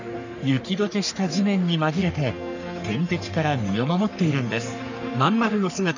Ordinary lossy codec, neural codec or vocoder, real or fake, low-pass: none; codec, 44.1 kHz, 3.4 kbps, Pupu-Codec; fake; 7.2 kHz